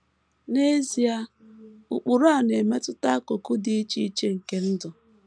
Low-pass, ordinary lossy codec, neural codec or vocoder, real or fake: 9.9 kHz; none; none; real